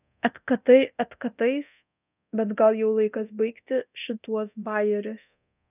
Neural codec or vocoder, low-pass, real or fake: codec, 24 kHz, 0.9 kbps, DualCodec; 3.6 kHz; fake